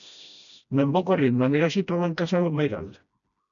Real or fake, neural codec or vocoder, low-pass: fake; codec, 16 kHz, 1 kbps, FreqCodec, smaller model; 7.2 kHz